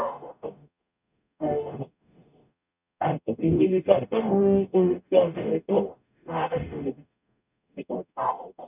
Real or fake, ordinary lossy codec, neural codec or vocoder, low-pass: fake; none; codec, 44.1 kHz, 0.9 kbps, DAC; 3.6 kHz